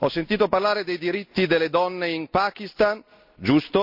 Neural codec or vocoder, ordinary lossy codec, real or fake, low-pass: none; MP3, 48 kbps; real; 5.4 kHz